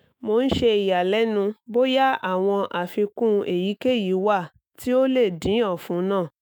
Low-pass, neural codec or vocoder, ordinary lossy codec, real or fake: none; autoencoder, 48 kHz, 128 numbers a frame, DAC-VAE, trained on Japanese speech; none; fake